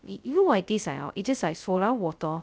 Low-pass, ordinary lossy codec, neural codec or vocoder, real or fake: none; none; codec, 16 kHz, 0.2 kbps, FocalCodec; fake